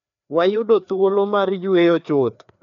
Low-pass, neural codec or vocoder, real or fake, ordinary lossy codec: 7.2 kHz; codec, 16 kHz, 2 kbps, FreqCodec, larger model; fake; none